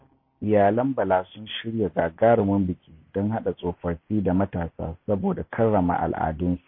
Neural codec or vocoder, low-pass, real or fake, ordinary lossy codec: none; 5.4 kHz; real; MP3, 24 kbps